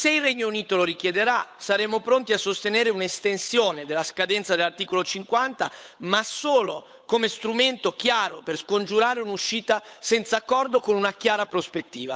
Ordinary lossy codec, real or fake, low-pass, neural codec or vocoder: none; fake; none; codec, 16 kHz, 8 kbps, FunCodec, trained on Chinese and English, 25 frames a second